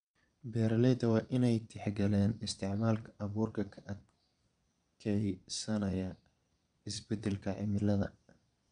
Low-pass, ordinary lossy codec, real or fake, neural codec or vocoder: 9.9 kHz; none; fake; vocoder, 22.05 kHz, 80 mel bands, Vocos